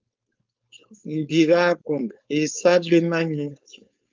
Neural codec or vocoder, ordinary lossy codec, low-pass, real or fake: codec, 16 kHz, 4.8 kbps, FACodec; Opus, 24 kbps; 7.2 kHz; fake